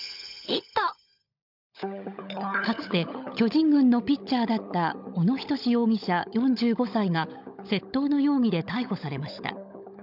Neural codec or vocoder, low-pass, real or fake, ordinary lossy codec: codec, 16 kHz, 16 kbps, FunCodec, trained on LibriTTS, 50 frames a second; 5.4 kHz; fake; none